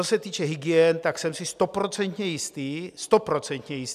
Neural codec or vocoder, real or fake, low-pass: none; real; 14.4 kHz